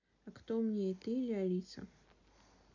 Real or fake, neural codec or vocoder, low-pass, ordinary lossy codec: real; none; 7.2 kHz; none